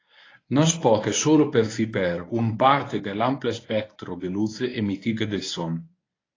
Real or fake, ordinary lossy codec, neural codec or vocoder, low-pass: fake; AAC, 32 kbps; codec, 24 kHz, 0.9 kbps, WavTokenizer, medium speech release version 1; 7.2 kHz